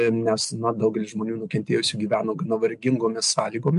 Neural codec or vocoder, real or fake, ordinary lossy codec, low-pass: none; real; MP3, 64 kbps; 10.8 kHz